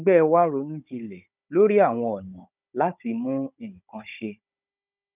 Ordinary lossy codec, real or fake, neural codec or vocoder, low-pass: none; fake; codec, 16 kHz, 16 kbps, FunCodec, trained on Chinese and English, 50 frames a second; 3.6 kHz